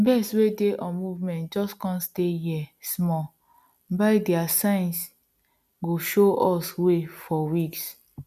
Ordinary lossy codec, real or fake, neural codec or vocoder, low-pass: none; real; none; 14.4 kHz